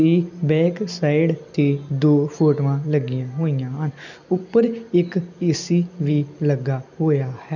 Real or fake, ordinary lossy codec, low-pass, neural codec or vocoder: real; none; 7.2 kHz; none